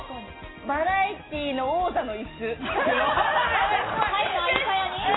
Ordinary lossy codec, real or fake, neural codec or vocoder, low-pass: AAC, 16 kbps; real; none; 7.2 kHz